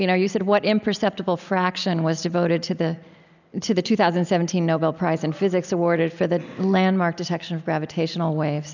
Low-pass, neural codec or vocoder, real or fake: 7.2 kHz; none; real